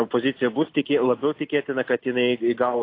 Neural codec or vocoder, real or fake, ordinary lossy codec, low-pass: none; real; AAC, 32 kbps; 5.4 kHz